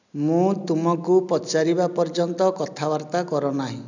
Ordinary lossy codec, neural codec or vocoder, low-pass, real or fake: none; none; 7.2 kHz; real